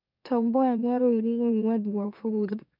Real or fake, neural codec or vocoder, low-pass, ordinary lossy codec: fake; autoencoder, 44.1 kHz, a latent of 192 numbers a frame, MeloTTS; 5.4 kHz; none